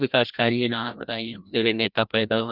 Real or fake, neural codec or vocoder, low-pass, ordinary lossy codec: fake; codec, 16 kHz, 1 kbps, FreqCodec, larger model; 5.4 kHz; none